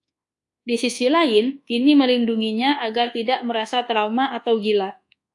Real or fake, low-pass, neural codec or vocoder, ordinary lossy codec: fake; 10.8 kHz; codec, 24 kHz, 1.2 kbps, DualCodec; AAC, 64 kbps